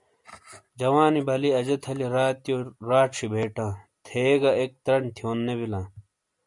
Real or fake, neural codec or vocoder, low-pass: real; none; 10.8 kHz